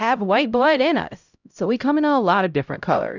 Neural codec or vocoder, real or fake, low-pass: codec, 16 kHz, 0.5 kbps, X-Codec, HuBERT features, trained on LibriSpeech; fake; 7.2 kHz